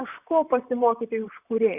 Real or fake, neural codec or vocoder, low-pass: fake; vocoder, 44.1 kHz, 128 mel bands, Pupu-Vocoder; 3.6 kHz